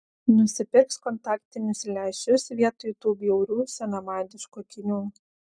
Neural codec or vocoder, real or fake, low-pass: none; real; 9.9 kHz